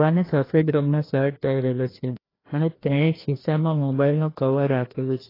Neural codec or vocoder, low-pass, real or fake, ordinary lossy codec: codec, 16 kHz, 1 kbps, FreqCodec, larger model; 5.4 kHz; fake; AAC, 24 kbps